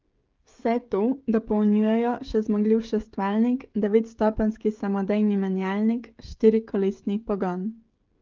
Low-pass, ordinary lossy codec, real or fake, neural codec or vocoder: 7.2 kHz; Opus, 32 kbps; fake; codec, 16 kHz, 16 kbps, FreqCodec, smaller model